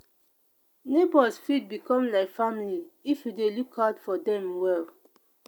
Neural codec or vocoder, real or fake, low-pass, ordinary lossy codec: none; real; none; none